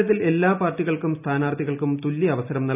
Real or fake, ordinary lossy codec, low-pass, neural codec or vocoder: real; none; 3.6 kHz; none